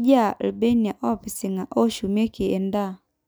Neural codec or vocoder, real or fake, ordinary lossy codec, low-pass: none; real; none; none